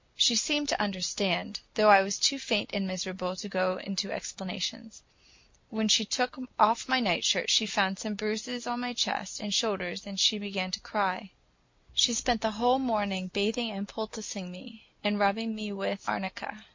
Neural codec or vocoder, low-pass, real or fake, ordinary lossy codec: none; 7.2 kHz; real; MP3, 48 kbps